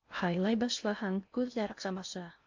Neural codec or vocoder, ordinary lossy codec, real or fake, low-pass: codec, 16 kHz in and 24 kHz out, 0.6 kbps, FocalCodec, streaming, 2048 codes; AAC, 48 kbps; fake; 7.2 kHz